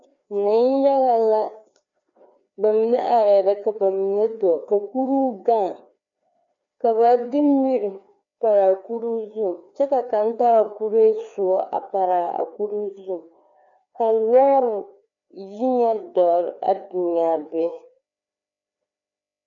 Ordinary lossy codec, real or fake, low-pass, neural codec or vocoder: MP3, 96 kbps; fake; 7.2 kHz; codec, 16 kHz, 2 kbps, FreqCodec, larger model